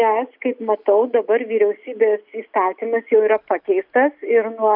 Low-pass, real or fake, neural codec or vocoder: 5.4 kHz; real; none